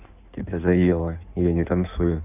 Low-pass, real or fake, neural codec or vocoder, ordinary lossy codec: 3.6 kHz; fake; codec, 16 kHz in and 24 kHz out, 1.1 kbps, FireRedTTS-2 codec; none